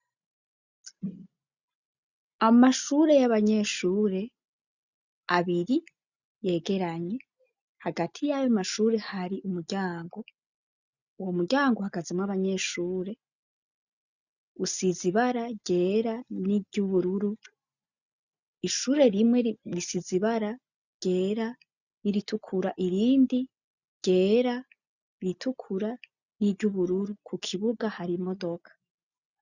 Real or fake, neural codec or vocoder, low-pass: real; none; 7.2 kHz